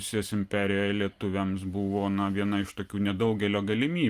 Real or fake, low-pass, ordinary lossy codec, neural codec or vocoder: real; 14.4 kHz; Opus, 32 kbps; none